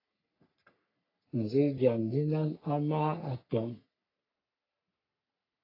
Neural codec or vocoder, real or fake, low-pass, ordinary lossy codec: codec, 44.1 kHz, 3.4 kbps, Pupu-Codec; fake; 5.4 kHz; AAC, 24 kbps